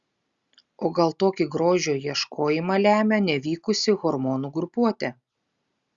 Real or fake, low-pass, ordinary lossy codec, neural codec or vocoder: real; 7.2 kHz; Opus, 64 kbps; none